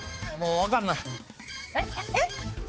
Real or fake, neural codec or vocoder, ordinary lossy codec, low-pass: fake; codec, 16 kHz, 4 kbps, X-Codec, HuBERT features, trained on balanced general audio; none; none